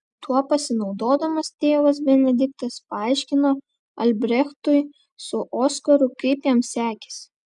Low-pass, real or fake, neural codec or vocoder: 10.8 kHz; real; none